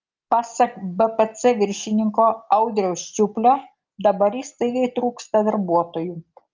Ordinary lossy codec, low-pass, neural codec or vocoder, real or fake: Opus, 24 kbps; 7.2 kHz; none; real